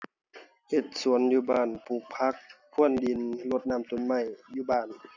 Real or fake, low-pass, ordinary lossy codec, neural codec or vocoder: real; 7.2 kHz; none; none